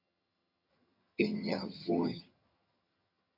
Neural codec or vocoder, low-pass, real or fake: vocoder, 22.05 kHz, 80 mel bands, HiFi-GAN; 5.4 kHz; fake